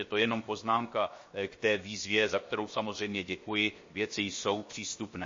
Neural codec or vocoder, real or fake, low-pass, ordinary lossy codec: codec, 16 kHz, 0.7 kbps, FocalCodec; fake; 7.2 kHz; MP3, 32 kbps